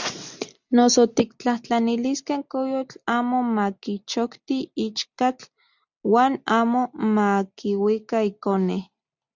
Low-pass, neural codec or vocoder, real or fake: 7.2 kHz; none; real